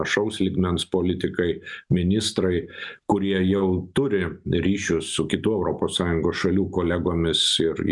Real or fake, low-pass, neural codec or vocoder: real; 10.8 kHz; none